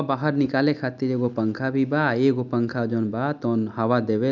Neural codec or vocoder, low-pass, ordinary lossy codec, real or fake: none; 7.2 kHz; none; real